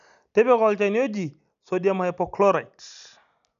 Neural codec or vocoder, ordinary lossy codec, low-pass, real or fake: none; none; 7.2 kHz; real